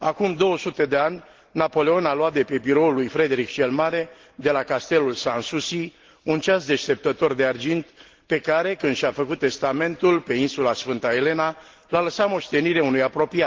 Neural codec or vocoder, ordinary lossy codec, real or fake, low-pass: none; Opus, 16 kbps; real; 7.2 kHz